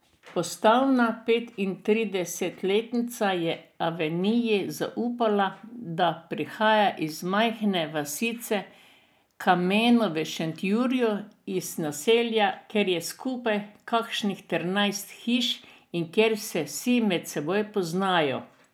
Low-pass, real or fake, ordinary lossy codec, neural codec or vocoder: none; real; none; none